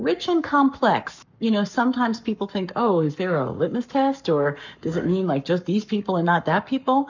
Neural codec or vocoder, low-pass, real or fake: codec, 44.1 kHz, 7.8 kbps, Pupu-Codec; 7.2 kHz; fake